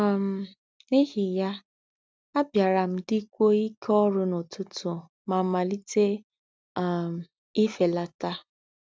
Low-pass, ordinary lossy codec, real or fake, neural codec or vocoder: none; none; real; none